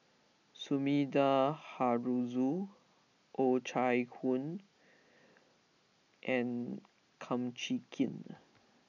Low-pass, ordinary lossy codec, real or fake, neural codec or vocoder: 7.2 kHz; none; real; none